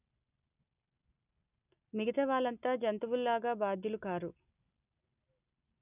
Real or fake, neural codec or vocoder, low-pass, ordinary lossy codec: real; none; 3.6 kHz; none